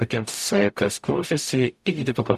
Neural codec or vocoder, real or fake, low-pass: codec, 44.1 kHz, 0.9 kbps, DAC; fake; 14.4 kHz